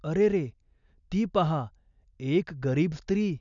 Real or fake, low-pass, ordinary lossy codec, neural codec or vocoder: real; 7.2 kHz; none; none